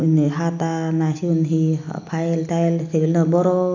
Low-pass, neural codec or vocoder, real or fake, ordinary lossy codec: 7.2 kHz; none; real; none